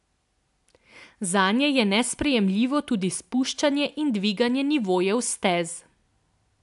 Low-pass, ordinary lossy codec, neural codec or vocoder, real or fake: 10.8 kHz; AAC, 96 kbps; none; real